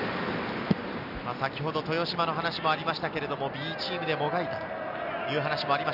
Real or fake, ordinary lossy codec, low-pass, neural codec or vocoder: real; none; 5.4 kHz; none